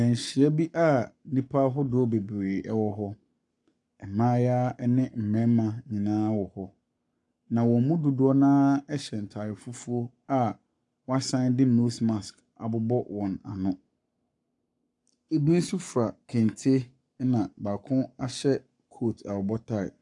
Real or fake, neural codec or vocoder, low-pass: fake; codec, 44.1 kHz, 7.8 kbps, Pupu-Codec; 10.8 kHz